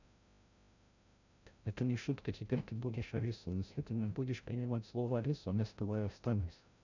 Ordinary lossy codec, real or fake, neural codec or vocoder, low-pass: none; fake; codec, 16 kHz, 0.5 kbps, FreqCodec, larger model; 7.2 kHz